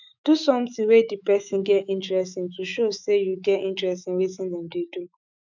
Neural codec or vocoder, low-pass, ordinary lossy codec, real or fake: autoencoder, 48 kHz, 128 numbers a frame, DAC-VAE, trained on Japanese speech; 7.2 kHz; none; fake